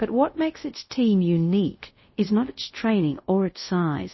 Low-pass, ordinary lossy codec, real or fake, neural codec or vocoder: 7.2 kHz; MP3, 24 kbps; fake; codec, 24 kHz, 0.5 kbps, DualCodec